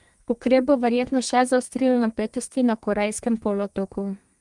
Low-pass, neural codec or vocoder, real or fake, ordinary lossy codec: 10.8 kHz; codec, 32 kHz, 1.9 kbps, SNAC; fake; Opus, 32 kbps